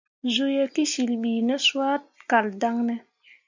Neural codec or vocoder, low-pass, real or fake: none; 7.2 kHz; real